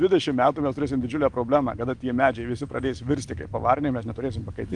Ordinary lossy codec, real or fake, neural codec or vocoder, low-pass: Opus, 32 kbps; real; none; 10.8 kHz